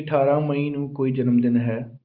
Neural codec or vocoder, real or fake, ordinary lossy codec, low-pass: none; real; Opus, 32 kbps; 5.4 kHz